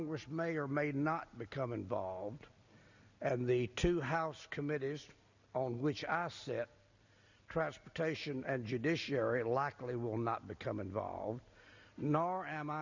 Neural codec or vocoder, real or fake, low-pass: none; real; 7.2 kHz